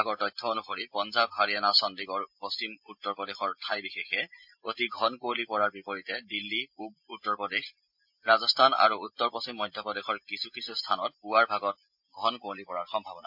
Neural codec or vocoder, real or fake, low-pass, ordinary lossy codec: none; real; 5.4 kHz; MP3, 48 kbps